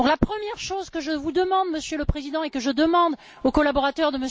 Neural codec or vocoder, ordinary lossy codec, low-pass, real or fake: none; none; none; real